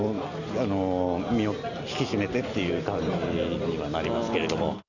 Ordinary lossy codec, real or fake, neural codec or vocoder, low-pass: none; real; none; 7.2 kHz